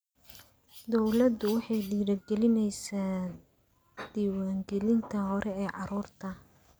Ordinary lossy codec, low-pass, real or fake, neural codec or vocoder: none; none; real; none